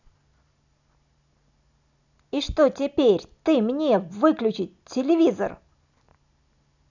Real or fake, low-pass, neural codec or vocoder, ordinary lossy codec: real; 7.2 kHz; none; none